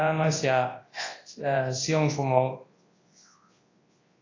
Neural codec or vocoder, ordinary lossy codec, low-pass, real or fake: codec, 24 kHz, 0.9 kbps, WavTokenizer, large speech release; AAC, 32 kbps; 7.2 kHz; fake